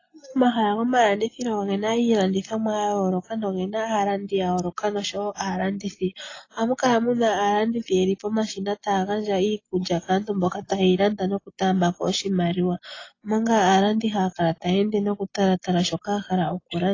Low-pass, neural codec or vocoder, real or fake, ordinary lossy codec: 7.2 kHz; none; real; AAC, 32 kbps